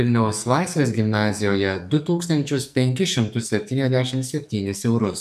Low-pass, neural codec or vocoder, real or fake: 14.4 kHz; codec, 44.1 kHz, 2.6 kbps, SNAC; fake